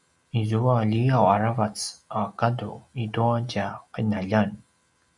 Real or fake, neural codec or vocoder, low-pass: real; none; 10.8 kHz